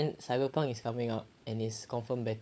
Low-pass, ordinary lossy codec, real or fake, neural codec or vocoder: none; none; fake; codec, 16 kHz, 16 kbps, FunCodec, trained on LibriTTS, 50 frames a second